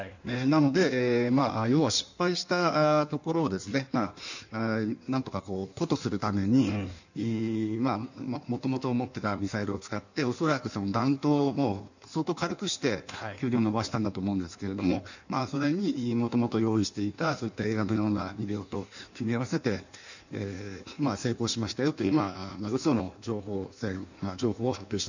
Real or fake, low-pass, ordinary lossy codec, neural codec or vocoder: fake; 7.2 kHz; none; codec, 16 kHz in and 24 kHz out, 1.1 kbps, FireRedTTS-2 codec